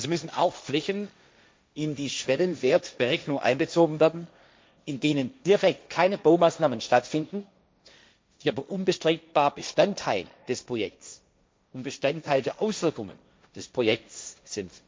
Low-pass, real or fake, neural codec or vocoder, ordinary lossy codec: none; fake; codec, 16 kHz, 1.1 kbps, Voila-Tokenizer; none